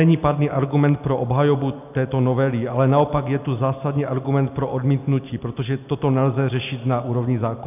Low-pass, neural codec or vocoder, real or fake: 3.6 kHz; none; real